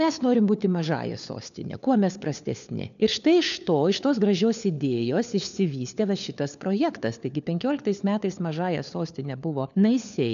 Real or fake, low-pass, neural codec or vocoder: fake; 7.2 kHz; codec, 16 kHz, 4 kbps, FunCodec, trained on LibriTTS, 50 frames a second